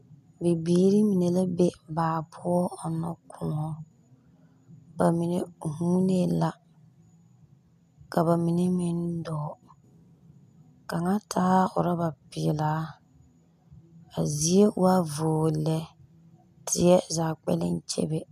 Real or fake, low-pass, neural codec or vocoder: real; 14.4 kHz; none